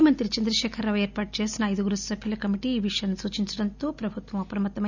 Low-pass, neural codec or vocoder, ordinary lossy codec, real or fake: 7.2 kHz; none; none; real